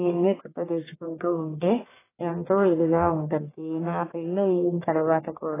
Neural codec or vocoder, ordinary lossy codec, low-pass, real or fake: codec, 44.1 kHz, 1.7 kbps, Pupu-Codec; AAC, 16 kbps; 3.6 kHz; fake